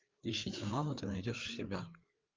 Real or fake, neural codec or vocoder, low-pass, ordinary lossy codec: fake; codec, 16 kHz, 4 kbps, FreqCodec, larger model; 7.2 kHz; Opus, 24 kbps